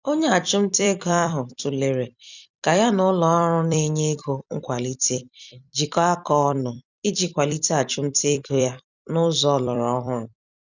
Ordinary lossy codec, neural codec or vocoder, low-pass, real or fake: none; vocoder, 44.1 kHz, 128 mel bands every 256 samples, BigVGAN v2; 7.2 kHz; fake